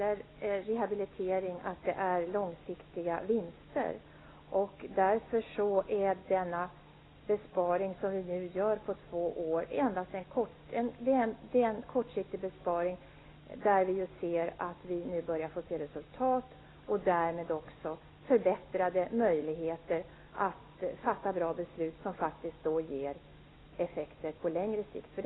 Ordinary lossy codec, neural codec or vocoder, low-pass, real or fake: AAC, 16 kbps; none; 7.2 kHz; real